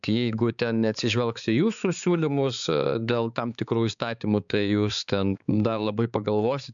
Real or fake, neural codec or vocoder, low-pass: fake; codec, 16 kHz, 4 kbps, X-Codec, HuBERT features, trained on balanced general audio; 7.2 kHz